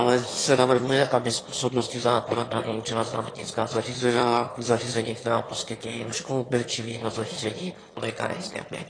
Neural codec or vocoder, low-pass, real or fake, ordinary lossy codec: autoencoder, 22.05 kHz, a latent of 192 numbers a frame, VITS, trained on one speaker; 9.9 kHz; fake; AAC, 32 kbps